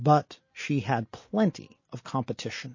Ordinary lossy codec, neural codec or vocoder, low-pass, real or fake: MP3, 32 kbps; none; 7.2 kHz; real